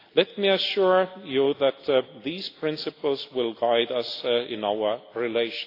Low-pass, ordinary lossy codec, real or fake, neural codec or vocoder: 5.4 kHz; AAC, 32 kbps; real; none